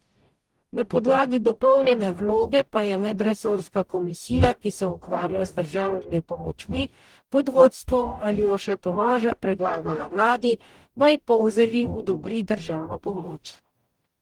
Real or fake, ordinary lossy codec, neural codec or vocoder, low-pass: fake; Opus, 24 kbps; codec, 44.1 kHz, 0.9 kbps, DAC; 19.8 kHz